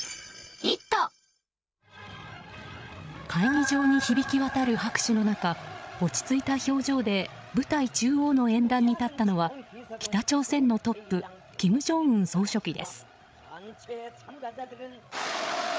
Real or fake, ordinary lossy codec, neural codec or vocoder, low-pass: fake; none; codec, 16 kHz, 8 kbps, FreqCodec, larger model; none